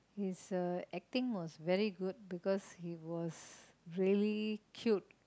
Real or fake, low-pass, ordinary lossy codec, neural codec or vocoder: real; none; none; none